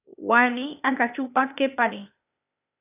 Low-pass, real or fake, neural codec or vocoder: 3.6 kHz; fake; codec, 16 kHz, 0.8 kbps, ZipCodec